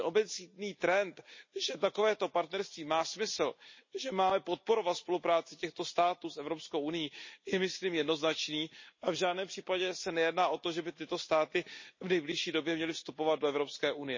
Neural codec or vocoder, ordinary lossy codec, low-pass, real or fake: none; MP3, 32 kbps; 7.2 kHz; real